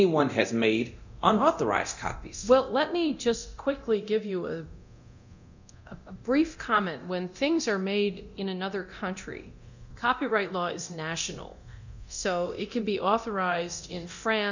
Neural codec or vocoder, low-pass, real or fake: codec, 24 kHz, 0.9 kbps, DualCodec; 7.2 kHz; fake